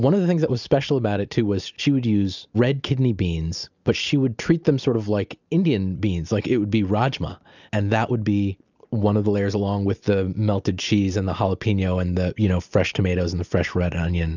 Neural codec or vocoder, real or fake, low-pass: none; real; 7.2 kHz